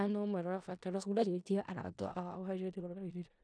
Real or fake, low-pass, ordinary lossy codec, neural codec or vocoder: fake; 9.9 kHz; none; codec, 16 kHz in and 24 kHz out, 0.4 kbps, LongCat-Audio-Codec, four codebook decoder